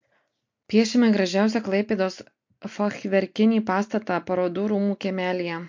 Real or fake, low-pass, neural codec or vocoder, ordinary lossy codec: real; 7.2 kHz; none; MP3, 48 kbps